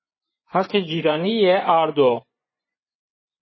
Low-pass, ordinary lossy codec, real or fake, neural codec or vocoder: 7.2 kHz; MP3, 24 kbps; fake; vocoder, 24 kHz, 100 mel bands, Vocos